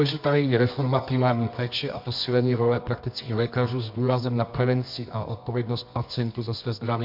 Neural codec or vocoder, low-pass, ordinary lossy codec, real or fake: codec, 24 kHz, 0.9 kbps, WavTokenizer, medium music audio release; 5.4 kHz; MP3, 48 kbps; fake